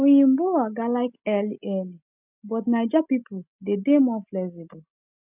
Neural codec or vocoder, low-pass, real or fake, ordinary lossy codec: none; 3.6 kHz; real; none